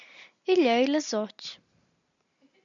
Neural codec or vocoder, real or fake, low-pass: none; real; 7.2 kHz